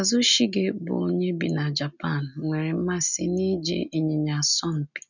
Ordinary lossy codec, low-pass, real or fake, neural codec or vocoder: none; 7.2 kHz; real; none